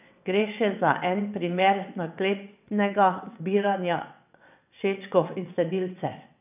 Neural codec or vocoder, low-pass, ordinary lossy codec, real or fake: vocoder, 22.05 kHz, 80 mel bands, WaveNeXt; 3.6 kHz; none; fake